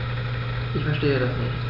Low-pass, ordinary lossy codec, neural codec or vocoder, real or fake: 5.4 kHz; none; none; real